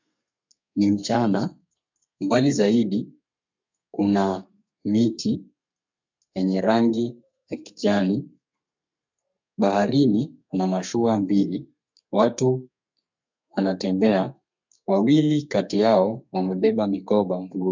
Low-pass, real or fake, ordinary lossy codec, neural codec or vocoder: 7.2 kHz; fake; MP3, 64 kbps; codec, 32 kHz, 1.9 kbps, SNAC